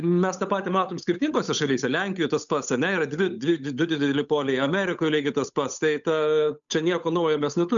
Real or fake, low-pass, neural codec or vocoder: fake; 7.2 kHz; codec, 16 kHz, 8 kbps, FunCodec, trained on Chinese and English, 25 frames a second